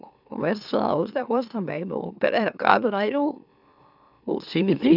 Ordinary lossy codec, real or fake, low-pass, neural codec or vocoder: none; fake; 5.4 kHz; autoencoder, 44.1 kHz, a latent of 192 numbers a frame, MeloTTS